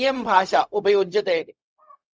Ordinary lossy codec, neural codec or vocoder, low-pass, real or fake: none; codec, 16 kHz, 0.4 kbps, LongCat-Audio-Codec; none; fake